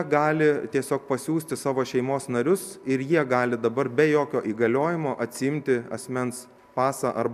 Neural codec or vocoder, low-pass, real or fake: none; 14.4 kHz; real